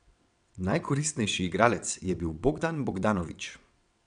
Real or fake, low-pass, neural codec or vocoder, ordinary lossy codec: fake; 9.9 kHz; vocoder, 22.05 kHz, 80 mel bands, WaveNeXt; none